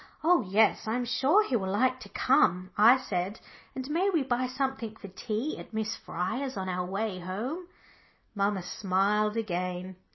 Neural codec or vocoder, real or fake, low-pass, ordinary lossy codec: none; real; 7.2 kHz; MP3, 24 kbps